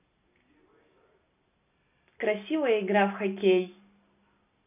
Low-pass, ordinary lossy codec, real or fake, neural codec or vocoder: 3.6 kHz; none; real; none